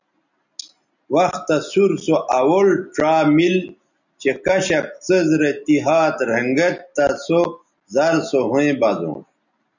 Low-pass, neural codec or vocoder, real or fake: 7.2 kHz; none; real